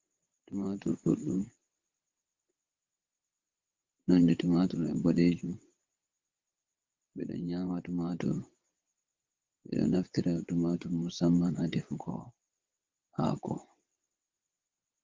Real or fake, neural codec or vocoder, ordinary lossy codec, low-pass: fake; vocoder, 22.05 kHz, 80 mel bands, WaveNeXt; Opus, 32 kbps; 7.2 kHz